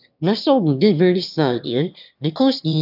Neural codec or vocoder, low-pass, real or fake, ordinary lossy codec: autoencoder, 22.05 kHz, a latent of 192 numbers a frame, VITS, trained on one speaker; 5.4 kHz; fake; none